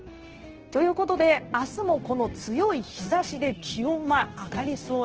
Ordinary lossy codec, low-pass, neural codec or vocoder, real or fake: Opus, 16 kbps; 7.2 kHz; codec, 16 kHz, 0.9 kbps, LongCat-Audio-Codec; fake